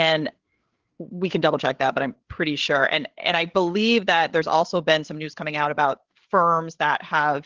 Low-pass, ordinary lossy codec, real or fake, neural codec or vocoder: 7.2 kHz; Opus, 16 kbps; fake; codec, 16 kHz, 8 kbps, FreqCodec, larger model